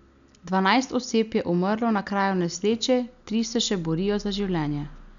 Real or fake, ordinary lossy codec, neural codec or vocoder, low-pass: real; none; none; 7.2 kHz